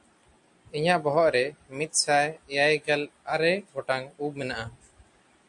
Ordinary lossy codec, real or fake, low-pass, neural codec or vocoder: AAC, 64 kbps; real; 10.8 kHz; none